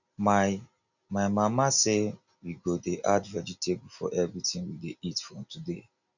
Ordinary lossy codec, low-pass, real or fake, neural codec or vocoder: none; 7.2 kHz; real; none